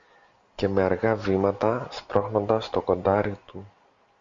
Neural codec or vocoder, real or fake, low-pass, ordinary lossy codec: none; real; 7.2 kHz; Opus, 64 kbps